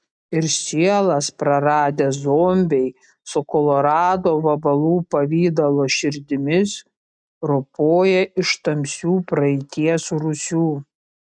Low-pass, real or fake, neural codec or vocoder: 9.9 kHz; real; none